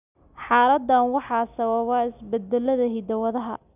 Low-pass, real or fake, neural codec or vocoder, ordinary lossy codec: 3.6 kHz; real; none; none